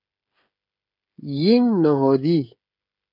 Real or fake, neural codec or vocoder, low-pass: fake; codec, 16 kHz, 16 kbps, FreqCodec, smaller model; 5.4 kHz